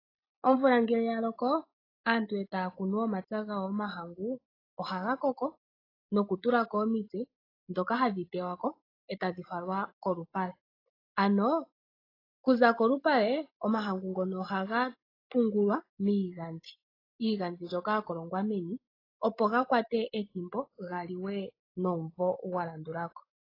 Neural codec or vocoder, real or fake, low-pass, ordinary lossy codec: none; real; 5.4 kHz; AAC, 24 kbps